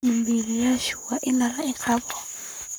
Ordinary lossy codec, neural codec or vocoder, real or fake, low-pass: none; codec, 44.1 kHz, 7.8 kbps, Pupu-Codec; fake; none